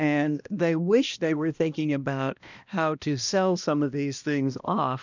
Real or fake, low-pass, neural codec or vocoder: fake; 7.2 kHz; codec, 16 kHz, 1 kbps, X-Codec, HuBERT features, trained on balanced general audio